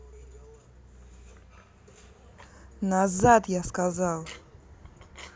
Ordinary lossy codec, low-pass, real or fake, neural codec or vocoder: none; none; real; none